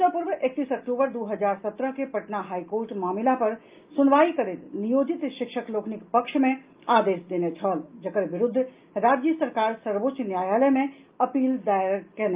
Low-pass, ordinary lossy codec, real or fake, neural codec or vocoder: 3.6 kHz; Opus, 32 kbps; real; none